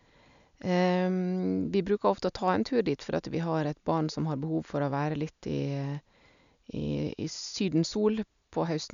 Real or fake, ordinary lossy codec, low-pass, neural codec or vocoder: real; none; 7.2 kHz; none